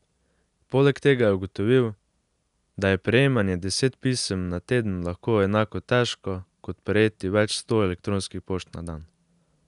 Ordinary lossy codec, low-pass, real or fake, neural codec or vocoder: none; 10.8 kHz; real; none